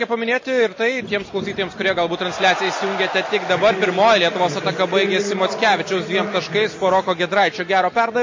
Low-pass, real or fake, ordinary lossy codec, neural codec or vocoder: 7.2 kHz; real; MP3, 32 kbps; none